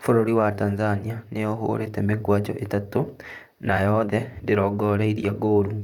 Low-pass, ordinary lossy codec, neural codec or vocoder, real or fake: 19.8 kHz; none; vocoder, 44.1 kHz, 128 mel bands, Pupu-Vocoder; fake